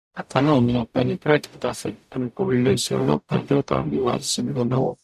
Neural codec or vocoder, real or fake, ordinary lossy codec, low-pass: codec, 44.1 kHz, 0.9 kbps, DAC; fake; none; 14.4 kHz